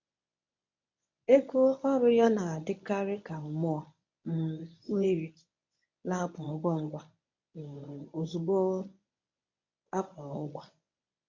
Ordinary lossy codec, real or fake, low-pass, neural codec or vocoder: MP3, 64 kbps; fake; 7.2 kHz; codec, 24 kHz, 0.9 kbps, WavTokenizer, medium speech release version 1